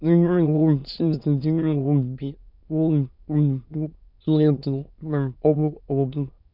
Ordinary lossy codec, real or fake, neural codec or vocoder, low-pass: none; fake; autoencoder, 22.05 kHz, a latent of 192 numbers a frame, VITS, trained on many speakers; 5.4 kHz